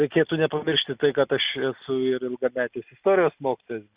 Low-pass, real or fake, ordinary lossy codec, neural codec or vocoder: 3.6 kHz; real; Opus, 64 kbps; none